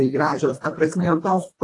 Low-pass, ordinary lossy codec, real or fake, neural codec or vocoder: 10.8 kHz; AAC, 48 kbps; fake; codec, 24 kHz, 1.5 kbps, HILCodec